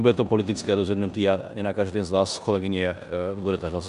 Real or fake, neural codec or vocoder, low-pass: fake; codec, 16 kHz in and 24 kHz out, 0.9 kbps, LongCat-Audio-Codec, four codebook decoder; 10.8 kHz